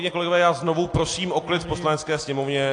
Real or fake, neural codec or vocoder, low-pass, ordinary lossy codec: real; none; 9.9 kHz; AAC, 48 kbps